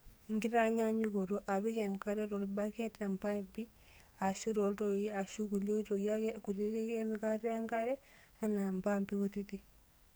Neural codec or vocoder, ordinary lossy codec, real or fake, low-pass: codec, 44.1 kHz, 2.6 kbps, SNAC; none; fake; none